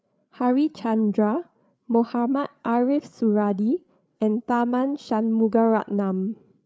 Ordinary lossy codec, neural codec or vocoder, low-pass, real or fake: none; codec, 16 kHz, 8 kbps, FreqCodec, larger model; none; fake